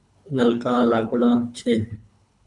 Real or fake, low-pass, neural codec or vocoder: fake; 10.8 kHz; codec, 24 kHz, 3 kbps, HILCodec